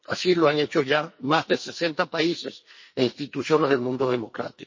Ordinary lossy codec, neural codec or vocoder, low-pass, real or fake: MP3, 32 kbps; codec, 44.1 kHz, 2.6 kbps, SNAC; 7.2 kHz; fake